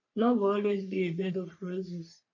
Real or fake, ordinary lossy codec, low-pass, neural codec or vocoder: fake; none; 7.2 kHz; codec, 44.1 kHz, 3.4 kbps, Pupu-Codec